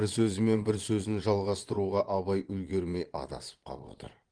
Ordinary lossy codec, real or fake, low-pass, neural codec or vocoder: Opus, 24 kbps; fake; 9.9 kHz; vocoder, 22.05 kHz, 80 mel bands, Vocos